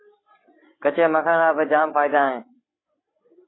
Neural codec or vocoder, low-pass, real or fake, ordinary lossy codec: codec, 16 kHz, 16 kbps, FreqCodec, larger model; 7.2 kHz; fake; AAC, 16 kbps